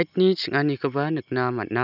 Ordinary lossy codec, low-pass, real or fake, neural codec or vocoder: none; 5.4 kHz; real; none